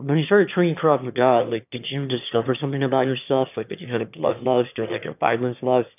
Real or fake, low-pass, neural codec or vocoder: fake; 3.6 kHz; autoencoder, 22.05 kHz, a latent of 192 numbers a frame, VITS, trained on one speaker